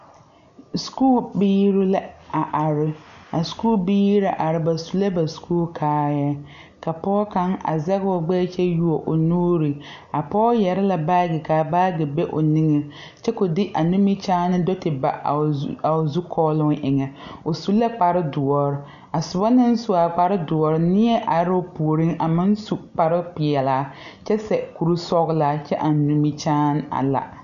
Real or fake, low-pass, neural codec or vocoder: real; 7.2 kHz; none